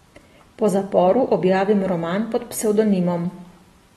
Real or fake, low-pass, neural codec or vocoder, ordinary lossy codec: real; 19.8 kHz; none; AAC, 32 kbps